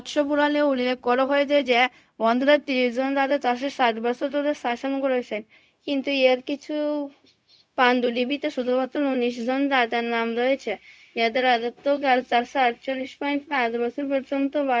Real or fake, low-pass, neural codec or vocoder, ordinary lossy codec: fake; none; codec, 16 kHz, 0.4 kbps, LongCat-Audio-Codec; none